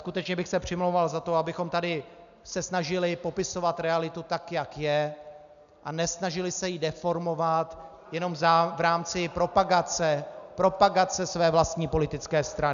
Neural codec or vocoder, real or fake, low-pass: none; real; 7.2 kHz